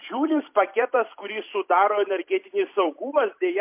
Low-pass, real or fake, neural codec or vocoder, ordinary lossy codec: 3.6 kHz; fake; vocoder, 44.1 kHz, 128 mel bands every 256 samples, BigVGAN v2; MP3, 32 kbps